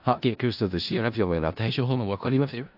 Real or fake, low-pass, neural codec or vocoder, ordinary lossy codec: fake; 5.4 kHz; codec, 16 kHz in and 24 kHz out, 0.4 kbps, LongCat-Audio-Codec, four codebook decoder; AAC, 48 kbps